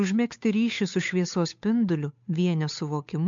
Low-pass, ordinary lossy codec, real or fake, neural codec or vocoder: 7.2 kHz; MP3, 64 kbps; fake; codec, 16 kHz, 8 kbps, FunCodec, trained on Chinese and English, 25 frames a second